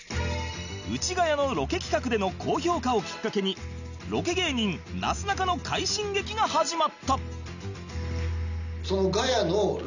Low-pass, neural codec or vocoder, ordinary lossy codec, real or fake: 7.2 kHz; none; none; real